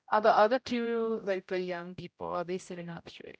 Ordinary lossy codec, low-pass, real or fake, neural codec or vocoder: none; none; fake; codec, 16 kHz, 0.5 kbps, X-Codec, HuBERT features, trained on general audio